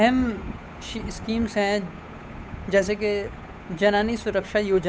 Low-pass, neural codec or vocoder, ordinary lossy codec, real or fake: none; codec, 16 kHz, 8 kbps, FunCodec, trained on Chinese and English, 25 frames a second; none; fake